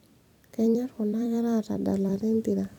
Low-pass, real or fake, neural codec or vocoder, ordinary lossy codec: 19.8 kHz; fake; vocoder, 44.1 kHz, 128 mel bands every 256 samples, BigVGAN v2; none